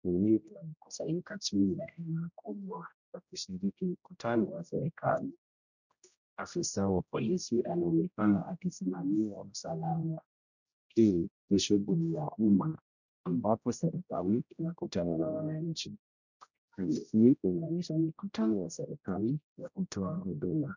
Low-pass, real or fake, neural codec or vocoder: 7.2 kHz; fake; codec, 16 kHz, 0.5 kbps, X-Codec, HuBERT features, trained on general audio